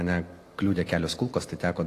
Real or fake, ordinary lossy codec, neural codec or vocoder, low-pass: real; AAC, 48 kbps; none; 14.4 kHz